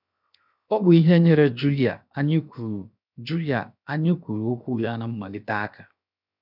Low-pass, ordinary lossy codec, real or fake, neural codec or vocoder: 5.4 kHz; none; fake; codec, 16 kHz, 0.7 kbps, FocalCodec